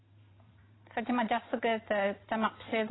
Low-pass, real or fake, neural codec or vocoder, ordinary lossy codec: 7.2 kHz; fake; vocoder, 44.1 kHz, 128 mel bands every 512 samples, BigVGAN v2; AAC, 16 kbps